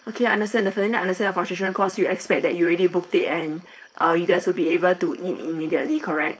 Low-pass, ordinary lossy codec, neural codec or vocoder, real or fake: none; none; codec, 16 kHz, 4.8 kbps, FACodec; fake